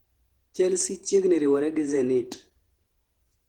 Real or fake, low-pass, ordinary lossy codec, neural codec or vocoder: fake; 19.8 kHz; Opus, 16 kbps; vocoder, 48 kHz, 128 mel bands, Vocos